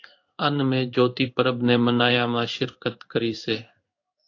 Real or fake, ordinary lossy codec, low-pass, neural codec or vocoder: fake; AAC, 48 kbps; 7.2 kHz; codec, 16 kHz in and 24 kHz out, 1 kbps, XY-Tokenizer